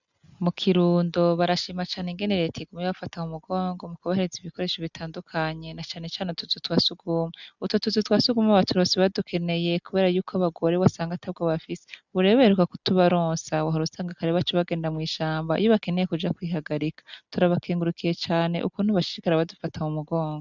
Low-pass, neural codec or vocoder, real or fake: 7.2 kHz; none; real